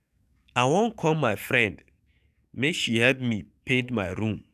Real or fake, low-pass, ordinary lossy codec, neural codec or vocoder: fake; 14.4 kHz; none; codec, 44.1 kHz, 7.8 kbps, DAC